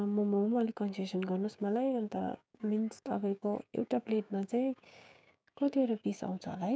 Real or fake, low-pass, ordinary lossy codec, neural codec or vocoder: fake; none; none; codec, 16 kHz, 6 kbps, DAC